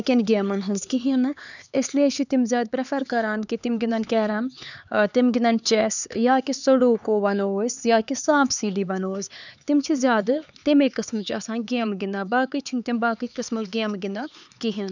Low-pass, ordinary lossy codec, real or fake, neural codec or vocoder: 7.2 kHz; none; fake; codec, 16 kHz, 4 kbps, X-Codec, HuBERT features, trained on LibriSpeech